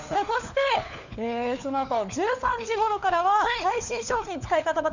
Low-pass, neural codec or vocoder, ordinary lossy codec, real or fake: 7.2 kHz; codec, 16 kHz, 4 kbps, FunCodec, trained on LibriTTS, 50 frames a second; none; fake